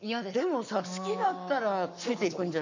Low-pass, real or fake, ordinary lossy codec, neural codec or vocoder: 7.2 kHz; fake; none; codec, 44.1 kHz, 7.8 kbps, Pupu-Codec